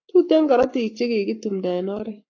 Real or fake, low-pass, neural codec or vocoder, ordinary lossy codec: fake; 7.2 kHz; codec, 44.1 kHz, 7.8 kbps, Pupu-Codec; AAC, 48 kbps